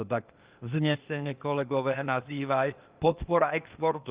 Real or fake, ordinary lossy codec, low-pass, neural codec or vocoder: fake; Opus, 32 kbps; 3.6 kHz; codec, 16 kHz, 0.8 kbps, ZipCodec